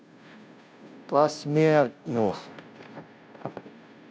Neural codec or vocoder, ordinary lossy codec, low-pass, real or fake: codec, 16 kHz, 0.5 kbps, FunCodec, trained on Chinese and English, 25 frames a second; none; none; fake